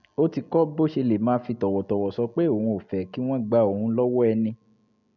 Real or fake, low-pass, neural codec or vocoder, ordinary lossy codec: real; 7.2 kHz; none; none